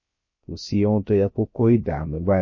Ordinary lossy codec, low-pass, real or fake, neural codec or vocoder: MP3, 32 kbps; 7.2 kHz; fake; codec, 16 kHz, 0.3 kbps, FocalCodec